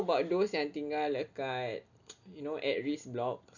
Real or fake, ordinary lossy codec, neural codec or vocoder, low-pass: real; none; none; 7.2 kHz